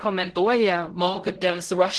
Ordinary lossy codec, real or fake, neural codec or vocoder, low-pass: Opus, 16 kbps; fake; codec, 16 kHz in and 24 kHz out, 0.4 kbps, LongCat-Audio-Codec, fine tuned four codebook decoder; 10.8 kHz